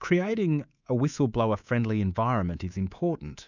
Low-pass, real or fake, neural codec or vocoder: 7.2 kHz; fake; codec, 24 kHz, 3.1 kbps, DualCodec